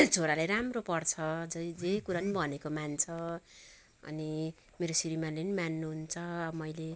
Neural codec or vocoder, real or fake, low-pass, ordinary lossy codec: none; real; none; none